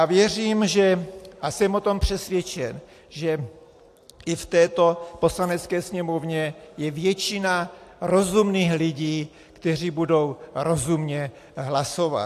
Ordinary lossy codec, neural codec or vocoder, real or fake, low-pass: AAC, 64 kbps; none; real; 14.4 kHz